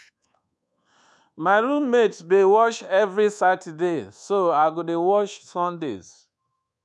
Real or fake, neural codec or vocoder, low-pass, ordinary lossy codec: fake; codec, 24 kHz, 1.2 kbps, DualCodec; none; none